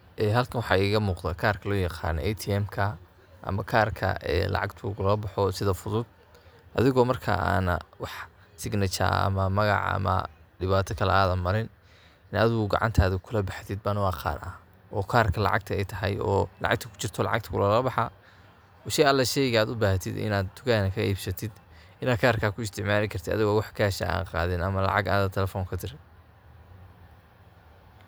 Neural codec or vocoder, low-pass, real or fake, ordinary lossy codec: none; none; real; none